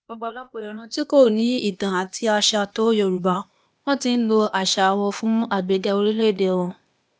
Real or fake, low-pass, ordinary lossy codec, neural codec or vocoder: fake; none; none; codec, 16 kHz, 0.8 kbps, ZipCodec